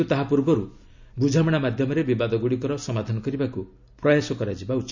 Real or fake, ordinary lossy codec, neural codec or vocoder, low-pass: real; none; none; 7.2 kHz